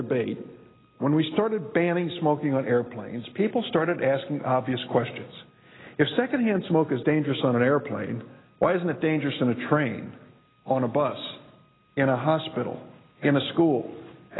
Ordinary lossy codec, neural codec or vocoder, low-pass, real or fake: AAC, 16 kbps; none; 7.2 kHz; real